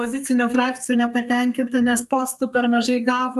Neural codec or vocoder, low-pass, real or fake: codec, 32 kHz, 1.9 kbps, SNAC; 14.4 kHz; fake